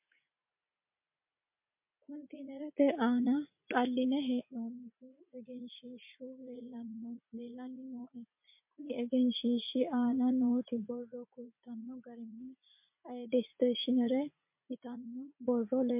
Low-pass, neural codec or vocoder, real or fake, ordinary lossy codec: 3.6 kHz; vocoder, 44.1 kHz, 80 mel bands, Vocos; fake; MP3, 32 kbps